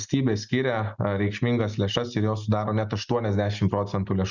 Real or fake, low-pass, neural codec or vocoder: real; 7.2 kHz; none